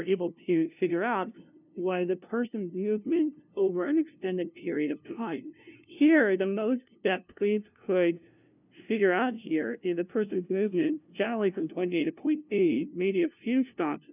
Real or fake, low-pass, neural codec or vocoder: fake; 3.6 kHz; codec, 16 kHz, 0.5 kbps, FunCodec, trained on LibriTTS, 25 frames a second